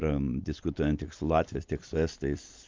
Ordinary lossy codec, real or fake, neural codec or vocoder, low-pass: Opus, 24 kbps; real; none; 7.2 kHz